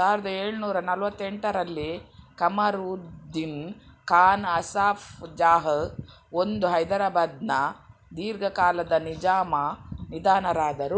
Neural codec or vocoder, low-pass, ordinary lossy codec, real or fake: none; none; none; real